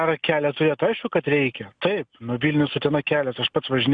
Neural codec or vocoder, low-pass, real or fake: none; 9.9 kHz; real